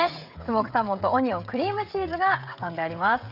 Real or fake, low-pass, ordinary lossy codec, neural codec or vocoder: fake; 5.4 kHz; none; codec, 16 kHz, 16 kbps, FreqCodec, smaller model